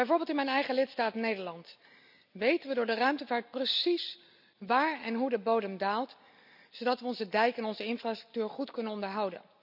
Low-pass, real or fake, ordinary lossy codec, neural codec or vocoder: 5.4 kHz; real; none; none